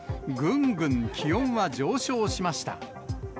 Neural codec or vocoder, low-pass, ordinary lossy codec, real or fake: none; none; none; real